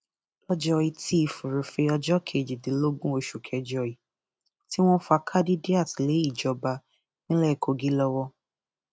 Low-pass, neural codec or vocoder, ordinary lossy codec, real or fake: none; none; none; real